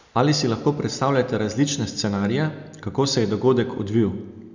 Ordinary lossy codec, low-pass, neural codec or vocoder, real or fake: none; 7.2 kHz; none; real